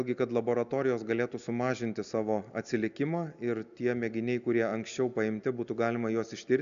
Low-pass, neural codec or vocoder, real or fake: 7.2 kHz; none; real